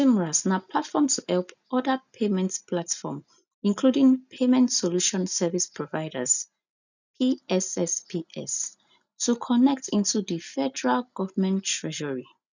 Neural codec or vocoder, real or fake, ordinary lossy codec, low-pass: none; real; none; 7.2 kHz